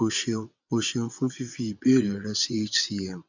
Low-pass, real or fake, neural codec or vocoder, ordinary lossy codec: 7.2 kHz; fake; vocoder, 22.05 kHz, 80 mel bands, WaveNeXt; none